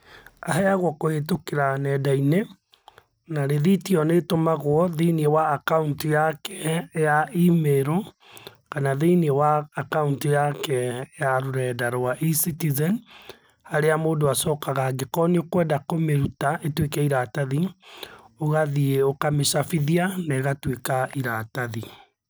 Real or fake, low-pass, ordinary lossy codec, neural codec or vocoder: real; none; none; none